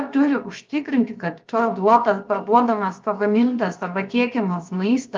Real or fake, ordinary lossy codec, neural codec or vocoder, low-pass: fake; Opus, 32 kbps; codec, 16 kHz, 0.7 kbps, FocalCodec; 7.2 kHz